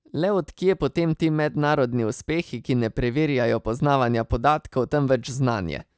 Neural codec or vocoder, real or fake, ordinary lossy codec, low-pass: none; real; none; none